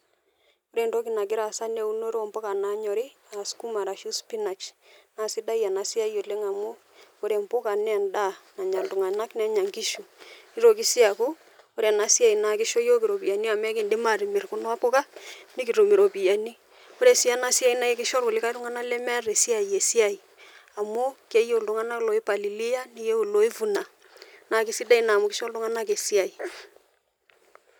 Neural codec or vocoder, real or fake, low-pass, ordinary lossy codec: none; real; none; none